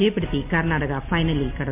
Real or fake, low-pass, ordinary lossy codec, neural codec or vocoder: real; 3.6 kHz; MP3, 32 kbps; none